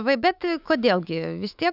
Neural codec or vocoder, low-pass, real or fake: autoencoder, 48 kHz, 128 numbers a frame, DAC-VAE, trained on Japanese speech; 5.4 kHz; fake